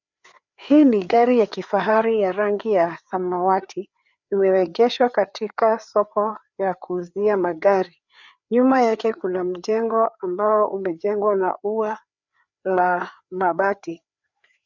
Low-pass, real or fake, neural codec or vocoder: 7.2 kHz; fake; codec, 16 kHz, 4 kbps, FreqCodec, larger model